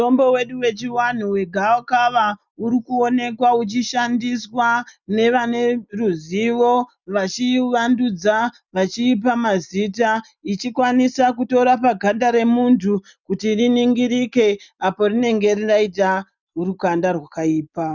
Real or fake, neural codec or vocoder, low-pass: real; none; 7.2 kHz